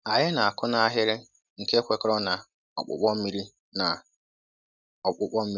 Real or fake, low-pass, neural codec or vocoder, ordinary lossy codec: real; 7.2 kHz; none; none